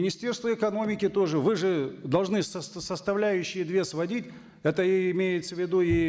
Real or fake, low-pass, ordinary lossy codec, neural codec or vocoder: real; none; none; none